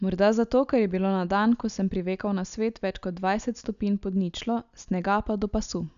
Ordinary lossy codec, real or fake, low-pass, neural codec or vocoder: none; real; 7.2 kHz; none